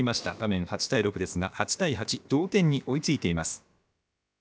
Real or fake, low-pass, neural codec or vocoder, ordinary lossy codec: fake; none; codec, 16 kHz, about 1 kbps, DyCAST, with the encoder's durations; none